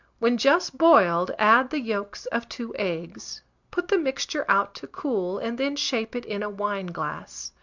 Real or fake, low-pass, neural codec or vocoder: real; 7.2 kHz; none